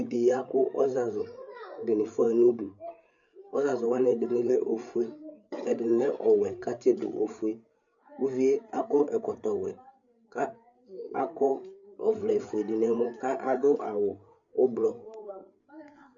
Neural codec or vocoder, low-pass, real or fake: codec, 16 kHz, 8 kbps, FreqCodec, larger model; 7.2 kHz; fake